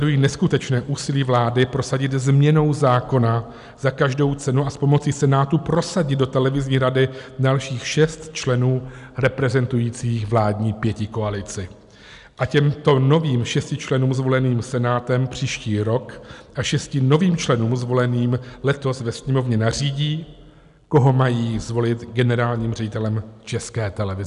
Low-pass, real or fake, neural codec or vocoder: 10.8 kHz; real; none